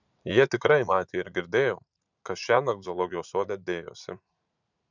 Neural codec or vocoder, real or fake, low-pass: vocoder, 44.1 kHz, 80 mel bands, Vocos; fake; 7.2 kHz